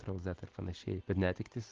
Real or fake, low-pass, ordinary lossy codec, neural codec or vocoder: real; 7.2 kHz; Opus, 16 kbps; none